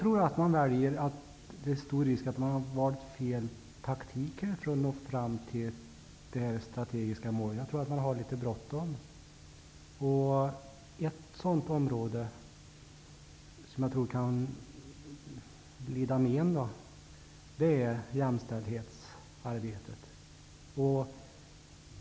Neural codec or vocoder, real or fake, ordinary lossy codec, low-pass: none; real; none; none